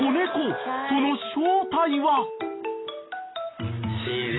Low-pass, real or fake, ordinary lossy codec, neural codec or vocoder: 7.2 kHz; real; AAC, 16 kbps; none